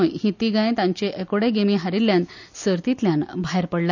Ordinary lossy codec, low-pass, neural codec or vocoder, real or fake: none; 7.2 kHz; none; real